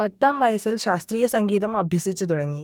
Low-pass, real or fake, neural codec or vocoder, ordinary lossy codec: 19.8 kHz; fake; codec, 44.1 kHz, 2.6 kbps, DAC; none